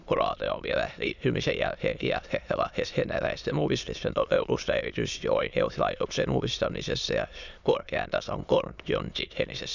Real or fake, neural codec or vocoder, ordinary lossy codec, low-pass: fake; autoencoder, 22.05 kHz, a latent of 192 numbers a frame, VITS, trained on many speakers; none; 7.2 kHz